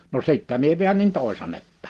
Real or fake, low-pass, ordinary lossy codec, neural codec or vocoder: real; 10.8 kHz; Opus, 16 kbps; none